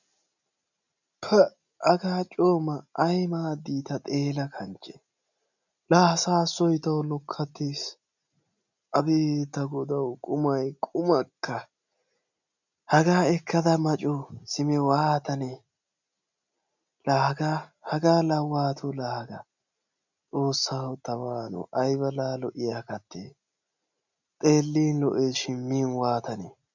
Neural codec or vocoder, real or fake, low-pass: none; real; 7.2 kHz